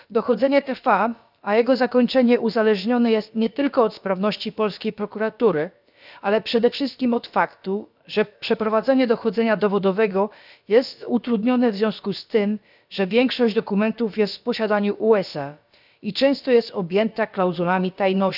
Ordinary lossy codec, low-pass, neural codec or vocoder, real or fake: none; 5.4 kHz; codec, 16 kHz, about 1 kbps, DyCAST, with the encoder's durations; fake